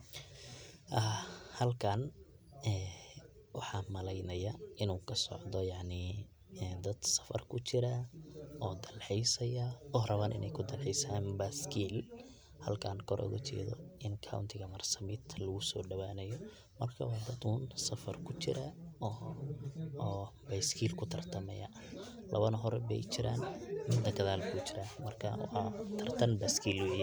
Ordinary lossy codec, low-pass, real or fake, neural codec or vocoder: none; none; real; none